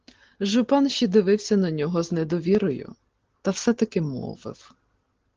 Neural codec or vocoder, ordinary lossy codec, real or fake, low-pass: none; Opus, 16 kbps; real; 7.2 kHz